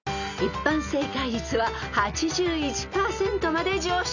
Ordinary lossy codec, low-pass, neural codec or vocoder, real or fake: none; 7.2 kHz; none; real